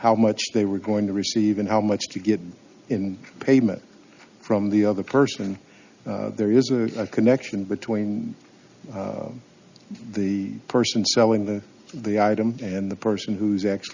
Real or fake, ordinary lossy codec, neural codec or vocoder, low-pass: real; Opus, 64 kbps; none; 7.2 kHz